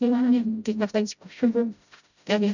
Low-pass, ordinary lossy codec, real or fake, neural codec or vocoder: 7.2 kHz; none; fake; codec, 16 kHz, 0.5 kbps, FreqCodec, smaller model